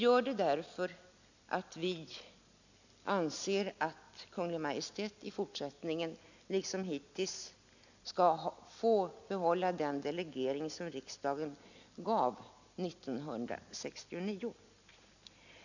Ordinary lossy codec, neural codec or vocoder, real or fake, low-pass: none; none; real; 7.2 kHz